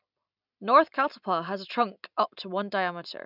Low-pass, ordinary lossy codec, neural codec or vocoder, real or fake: 5.4 kHz; none; none; real